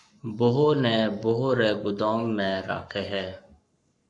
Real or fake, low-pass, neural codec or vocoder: fake; 10.8 kHz; codec, 44.1 kHz, 7.8 kbps, Pupu-Codec